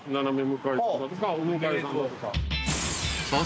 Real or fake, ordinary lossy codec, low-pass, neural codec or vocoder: real; none; none; none